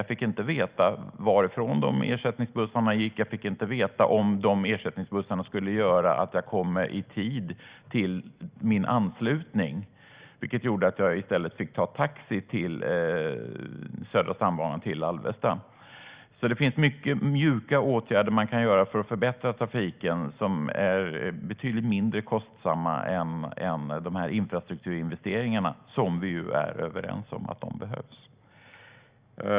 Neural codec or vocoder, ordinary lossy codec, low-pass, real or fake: none; Opus, 64 kbps; 3.6 kHz; real